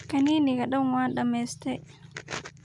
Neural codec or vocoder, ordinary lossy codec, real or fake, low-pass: none; none; real; 10.8 kHz